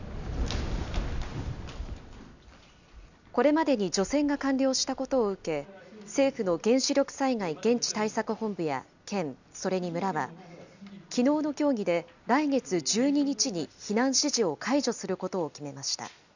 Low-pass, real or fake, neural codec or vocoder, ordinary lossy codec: 7.2 kHz; real; none; none